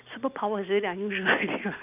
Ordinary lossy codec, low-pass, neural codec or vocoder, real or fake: none; 3.6 kHz; none; real